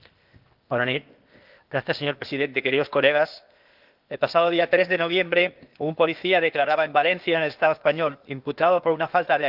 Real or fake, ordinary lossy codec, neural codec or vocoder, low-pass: fake; Opus, 32 kbps; codec, 16 kHz, 0.8 kbps, ZipCodec; 5.4 kHz